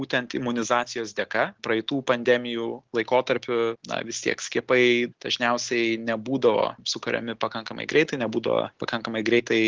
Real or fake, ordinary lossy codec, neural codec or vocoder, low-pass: real; Opus, 16 kbps; none; 7.2 kHz